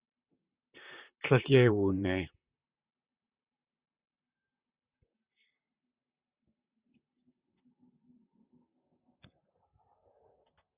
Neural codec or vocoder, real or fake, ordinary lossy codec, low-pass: vocoder, 44.1 kHz, 128 mel bands, Pupu-Vocoder; fake; Opus, 64 kbps; 3.6 kHz